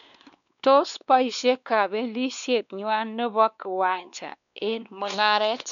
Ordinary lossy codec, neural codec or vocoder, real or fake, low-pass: none; codec, 16 kHz, 2 kbps, X-Codec, WavLM features, trained on Multilingual LibriSpeech; fake; 7.2 kHz